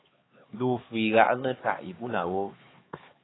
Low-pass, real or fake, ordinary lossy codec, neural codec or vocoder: 7.2 kHz; fake; AAC, 16 kbps; codec, 16 kHz, 4 kbps, X-Codec, HuBERT features, trained on LibriSpeech